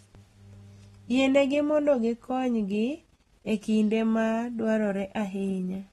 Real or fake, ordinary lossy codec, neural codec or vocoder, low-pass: real; AAC, 32 kbps; none; 19.8 kHz